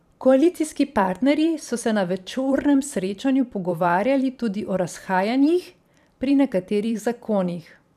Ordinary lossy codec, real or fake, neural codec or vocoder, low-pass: none; fake; vocoder, 44.1 kHz, 128 mel bands every 512 samples, BigVGAN v2; 14.4 kHz